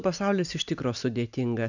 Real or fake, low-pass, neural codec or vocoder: real; 7.2 kHz; none